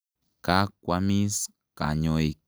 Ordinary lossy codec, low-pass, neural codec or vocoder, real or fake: none; none; none; real